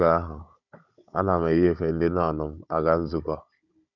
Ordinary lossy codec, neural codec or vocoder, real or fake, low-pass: none; codec, 16 kHz, 16 kbps, FunCodec, trained on Chinese and English, 50 frames a second; fake; 7.2 kHz